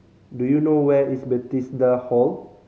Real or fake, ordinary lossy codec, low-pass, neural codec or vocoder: real; none; none; none